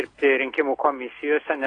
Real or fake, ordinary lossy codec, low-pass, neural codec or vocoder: real; AAC, 32 kbps; 9.9 kHz; none